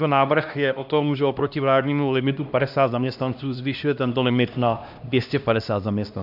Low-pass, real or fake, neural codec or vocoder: 5.4 kHz; fake; codec, 16 kHz, 1 kbps, X-Codec, HuBERT features, trained on LibriSpeech